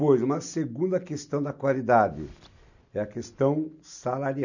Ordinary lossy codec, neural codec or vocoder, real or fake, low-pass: none; none; real; 7.2 kHz